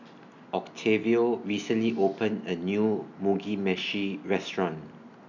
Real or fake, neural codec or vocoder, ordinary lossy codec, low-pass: real; none; none; 7.2 kHz